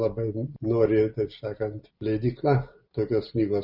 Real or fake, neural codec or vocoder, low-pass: real; none; 5.4 kHz